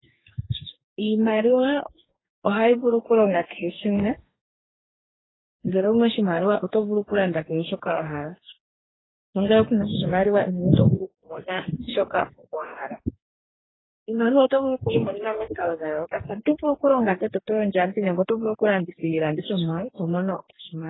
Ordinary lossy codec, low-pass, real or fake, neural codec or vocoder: AAC, 16 kbps; 7.2 kHz; fake; codec, 44.1 kHz, 2.6 kbps, DAC